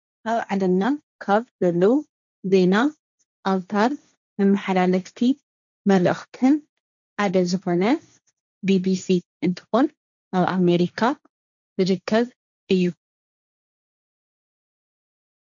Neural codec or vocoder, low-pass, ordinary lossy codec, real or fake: codec, 16 kHz, 1.1 kbps, Voila-Tokenizer; 7.2 kHz; MP3, 96 kbps; fake